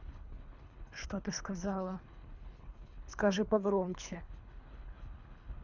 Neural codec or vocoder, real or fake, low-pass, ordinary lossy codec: codec, 24 kHz, 3 kbps, HILCodec; fake; 7.2 kHz; none